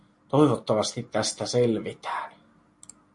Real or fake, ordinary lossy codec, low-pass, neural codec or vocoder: real; MP3, 48 kbps; 10.8 kHz; none